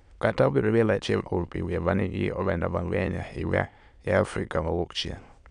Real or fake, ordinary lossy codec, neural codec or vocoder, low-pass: fake; none; autoencoder, 22.05 kHz, a latent of 192 numbers a frame, VITS, trained on many speakers; 9.9 kHz